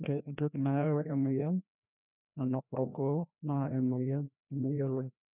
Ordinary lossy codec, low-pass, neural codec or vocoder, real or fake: none; 3.6 kHz; codec, 16 kHz, 1 kbps, FreqCodec, larger model; fake